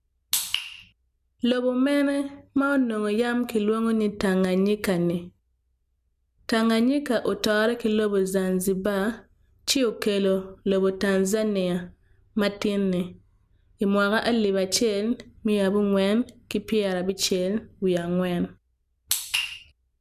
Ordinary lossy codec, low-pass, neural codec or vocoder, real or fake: none; 14.4 kHz; none; real